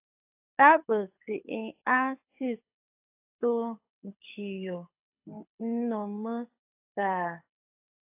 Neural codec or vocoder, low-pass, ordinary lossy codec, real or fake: codec, 24 kHz, 6 kbps, HILCodec; 3.6 kHz; AAC, 32 kbps; fake